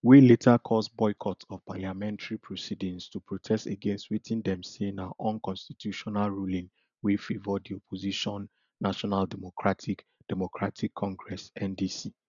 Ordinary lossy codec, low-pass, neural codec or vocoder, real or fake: none; 7.2 kHz; none; real